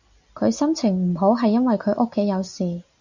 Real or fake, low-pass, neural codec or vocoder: real; 7.2 kHz; none